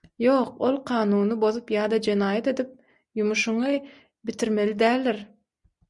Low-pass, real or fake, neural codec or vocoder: 10.8 kHz; real; none